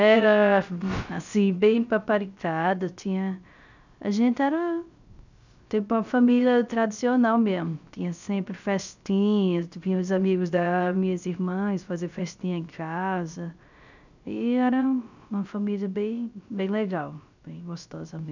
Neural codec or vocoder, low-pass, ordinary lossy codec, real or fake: codec, 16 kHz, 0.3 kbps, FocalCodec; 7.2 kHz; none; fake